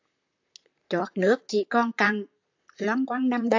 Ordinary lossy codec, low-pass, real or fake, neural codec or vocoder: AAC, 48 kbps; 7.2 kHz; fake; vocoder, 44.1 kHz, 128 mel bands, Pupu-Vocoder